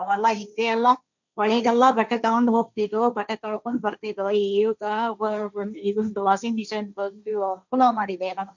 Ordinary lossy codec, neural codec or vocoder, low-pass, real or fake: none; codec, 16 kHz, 1.1 kbps, Voila-Tokenizer; 7.2 kHz; fake